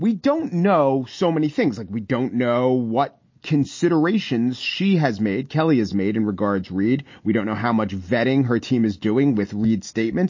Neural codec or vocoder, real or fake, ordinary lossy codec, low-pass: none; real; MP3, 32 kbps; 7.2 kHz